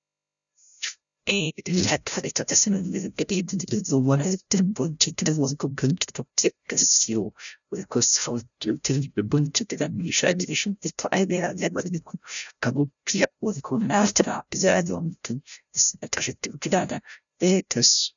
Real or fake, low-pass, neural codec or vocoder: fake; 7.2 kHz; codec, 16 kHz, 0.5 kbps, FreqCodec, larger model